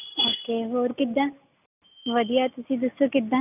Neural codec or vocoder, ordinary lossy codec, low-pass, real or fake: none; none; 3.6 kHz; real